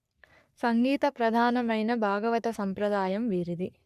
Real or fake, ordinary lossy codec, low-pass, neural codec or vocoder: fake; none; 14.4 kHz; codec, 44.1 kHz, 3.4 kbps, Pupu-Codec